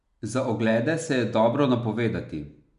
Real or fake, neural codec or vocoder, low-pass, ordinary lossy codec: real; none; 10.8 kHz; none